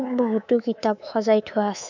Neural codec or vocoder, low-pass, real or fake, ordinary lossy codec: codec, 24 kHz, 3.1 kbps, DualCodec; 7.2 kHz; fake; none